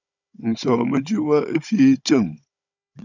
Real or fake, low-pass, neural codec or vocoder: fake; 7.2 kHz; codec, 16 kHz, 16 kbps, FunCodec, trained on Chinese and English, 50 frames a second